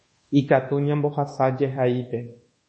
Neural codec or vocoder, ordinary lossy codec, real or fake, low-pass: codec, 24 kHz, 1.2 kbps, DualCodec; MP3, 32 kbps; fake; 10.8 kHz